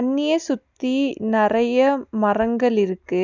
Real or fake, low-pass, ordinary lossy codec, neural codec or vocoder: real; 7.2 kHz; none; none